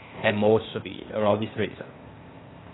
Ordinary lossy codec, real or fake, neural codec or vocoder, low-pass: AAC, 16 kbps; fake; codec, 16 kHz, 0.8 kbps, ZipCodec; 7.2 kHz